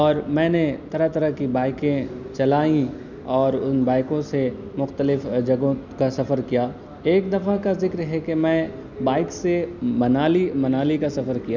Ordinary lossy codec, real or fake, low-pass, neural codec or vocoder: none; real; 7.2 kHz; none